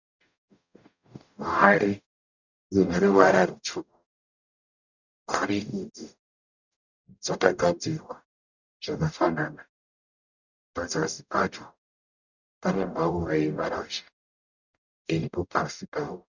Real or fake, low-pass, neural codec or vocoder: fake; 7.2 kHz; codec, 44.1 kHz, 0.9 kbps, DAC